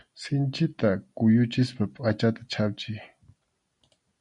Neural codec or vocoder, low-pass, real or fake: none; 10.8 kHz; real